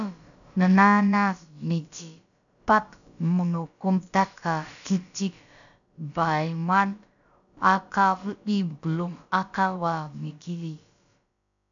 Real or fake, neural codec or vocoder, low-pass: fake; codec, 16 kHz, about 1 kbps, DyCAST, with the encoder's durations; 7.2 kHz